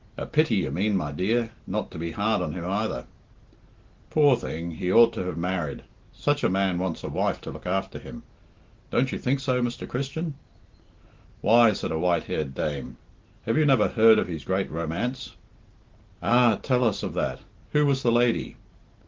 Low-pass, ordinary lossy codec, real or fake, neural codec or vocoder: 7.2 kHz; Opus, 16 kbps; real; none